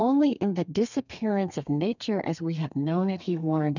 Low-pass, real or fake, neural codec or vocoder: 7.2 kHz; fake; codec, 32 kHz, 1.9 kbps, SNAC